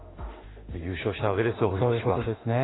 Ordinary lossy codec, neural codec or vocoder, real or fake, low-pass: AAC, 16 kbps; autoencoder, 48 kHz, 32 numbers a frame, DAC-VAE, trained on Japanese speech; fake; 7.2 kHz